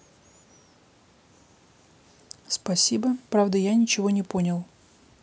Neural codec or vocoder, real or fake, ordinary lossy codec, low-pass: none; real; none; none